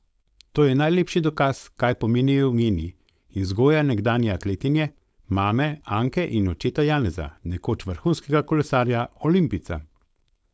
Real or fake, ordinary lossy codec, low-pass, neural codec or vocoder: fake; none; none; codec, 16 kHz, 4.8 kbps, FACodec